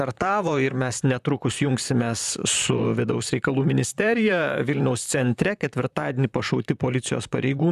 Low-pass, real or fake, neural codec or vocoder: 14.4 kHz; fake; vocoder, 44.1 kHz, 128 mel bands, Pupu-Vocoder